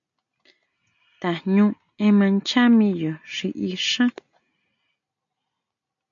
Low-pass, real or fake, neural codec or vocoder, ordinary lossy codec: 7.2 kHz; real; none; MP3, 48 kbps